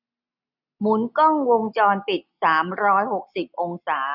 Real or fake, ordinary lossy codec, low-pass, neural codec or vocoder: real; none; 5.4 kHz; none